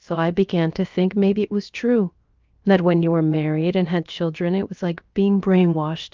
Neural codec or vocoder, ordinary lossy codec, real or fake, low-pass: codec, 16 kHz, about 1 kbps, DyCAST, with the encoder's durations; Opus, 24 kbps; fake; 7.2 kHz